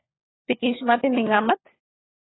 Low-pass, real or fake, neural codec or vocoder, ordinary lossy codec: 7.2 kHz; fake; codec, 16 kHz, 16 kbps, FunCodec, trained on LibriTTS, 50 frames a second; AAC, 16 kbps